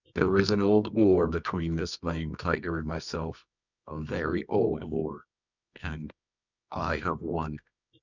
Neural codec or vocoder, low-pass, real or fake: codec, 24 kHz, 0.9 kbps, WavTokenizer, medium music audio release; 7.2 kHz; fake